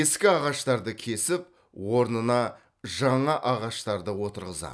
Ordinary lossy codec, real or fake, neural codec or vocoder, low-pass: none; real; none; none